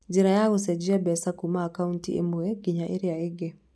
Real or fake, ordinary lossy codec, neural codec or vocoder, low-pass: real; none; none; none